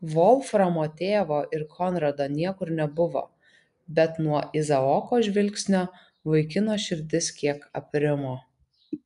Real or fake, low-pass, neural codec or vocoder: real; 10.8 kHz; none